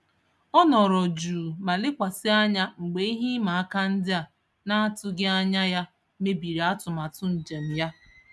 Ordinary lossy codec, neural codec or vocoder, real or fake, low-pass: none; none; real; none